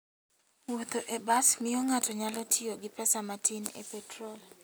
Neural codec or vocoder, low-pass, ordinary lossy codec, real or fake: none; none; none; real